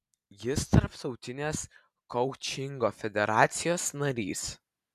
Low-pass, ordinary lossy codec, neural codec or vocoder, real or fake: 14.4 kHz; AAC, 96 kbps; none; real